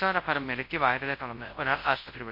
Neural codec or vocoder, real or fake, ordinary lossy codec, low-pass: codec, 24 kHz, 0.9 kbps, WavTokenizer, large speech release; fake; MP3, 32 kbps; 5.4 kHz